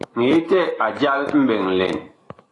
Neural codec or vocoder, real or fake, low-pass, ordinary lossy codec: autoencoder, 48 kHz, 128 numbers a frame, DAC-VAE, trained on Japanese speech; fake; 10.8 kHz; AAC, 32 kbps